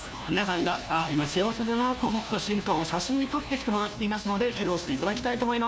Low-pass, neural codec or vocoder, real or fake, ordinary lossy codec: none; codec, 16 kHz, 1 kbps, FunCodec, trained on LibriTTS, 50 frames a second; fake; none